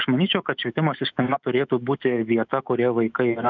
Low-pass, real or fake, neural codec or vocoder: 7.2 kHz; fake; autoencoder, 48 kHz, 128 numbers a frame, DAC-VAE, trained on Japanese speech